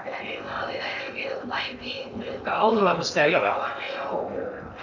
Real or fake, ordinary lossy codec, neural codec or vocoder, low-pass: fake; none; codec, 16 kHz in and 24 kHz out, 0.8 kbps, FocalCodec, streaming, 65536 codes; 7.2 kHz